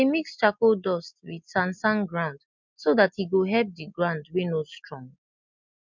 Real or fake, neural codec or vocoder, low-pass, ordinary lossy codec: real; none; 7.2 kHz; MP3, 64 kbps